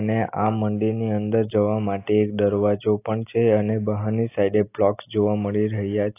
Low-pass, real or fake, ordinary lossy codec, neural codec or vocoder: 3.6 kHz; real; AAC, 24 kbps; none